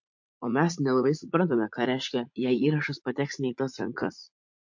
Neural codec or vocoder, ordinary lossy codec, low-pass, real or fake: vocoder, 44.1 kHz, 80 mel bands, Vocos; MP3, 48 kbps; 7.2 kHz; fake